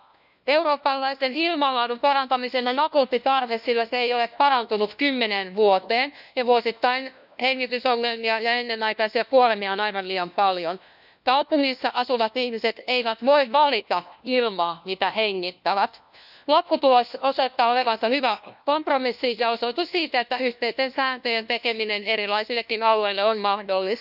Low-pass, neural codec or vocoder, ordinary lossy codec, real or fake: 5.4 kHz; codec, 16 kHz, 1 kbps, FunCodec, trained on LibriTTS, 50 frames a second; none; fake